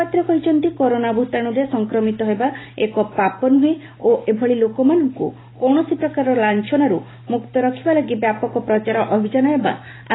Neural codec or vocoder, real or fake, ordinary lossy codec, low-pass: none; real; AAC, 16 kbps; 7.2 kHz